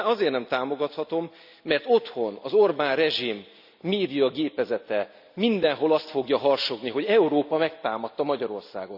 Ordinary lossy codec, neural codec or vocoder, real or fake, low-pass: none; none; real; 5.4 kHz